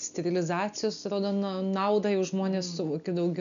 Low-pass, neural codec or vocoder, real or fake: 7.2 kHz; none; real